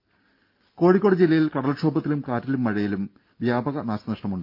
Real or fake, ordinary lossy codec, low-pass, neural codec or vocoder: real; Opus, 24 kbps; 5.4 kHz; none